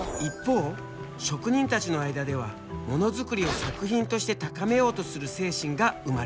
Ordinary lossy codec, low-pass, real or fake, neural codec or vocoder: none; none; real; none